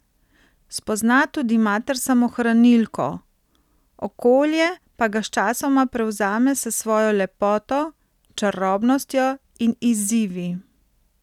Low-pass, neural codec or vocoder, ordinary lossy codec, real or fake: 19.8 kHz; none; none; real